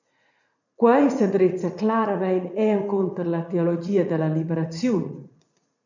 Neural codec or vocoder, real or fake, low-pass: vocoder, 44.1 kHz, 80 mel bands, Vocos; fake; 7.2 kHz